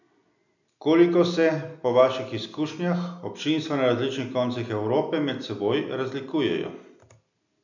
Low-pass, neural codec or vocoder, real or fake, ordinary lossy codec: 7.2 kHz; none; real; none